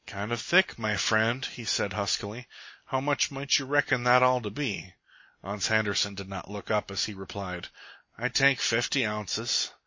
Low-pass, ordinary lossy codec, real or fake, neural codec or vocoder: 7.2 kHz; MP3, 32 kbps; real; none